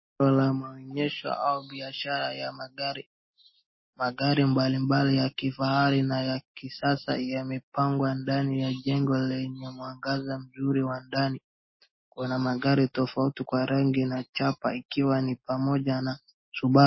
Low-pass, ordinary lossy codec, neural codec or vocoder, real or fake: 7.2 kHz; MP3, 24 kbps; none; real